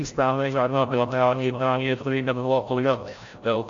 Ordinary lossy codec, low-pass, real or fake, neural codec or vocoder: AAC, 48 kbps; 7.2 kHz; fake; codec, 16 kHz, 0.5 kbps, FreqCodec, larger model